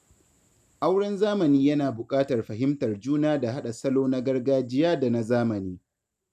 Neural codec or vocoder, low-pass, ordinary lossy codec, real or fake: none; 14.4 kHz; none; real